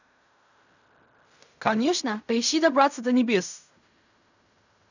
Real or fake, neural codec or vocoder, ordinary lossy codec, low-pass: fake; codec, 16 kHz in and 24 kHz out, 0.4 kbps, LongCat-Audio-Codec, fine tuned four codebook decoder; none; 7.2 kHz